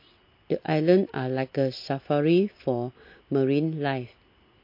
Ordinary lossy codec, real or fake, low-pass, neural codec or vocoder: MP3, 32 kbps; real; 5.4 kHz; none